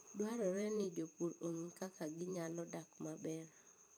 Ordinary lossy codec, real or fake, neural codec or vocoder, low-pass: none; fake; vocoder, 44.1 kHz, 128 mel bands every 512 samples, BigVGAN v2; none